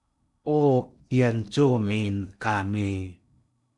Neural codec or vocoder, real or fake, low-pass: codec, 16 kHz in and 24 kHz out, 0.6 kbps, FocalCodec, streaming, 2048 codes; fake; 10.8 kHz